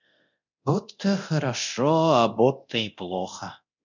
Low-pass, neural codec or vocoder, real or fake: 7.2 kHz; codec, 24 kHz, 0.9 kbps, DualCodec; fake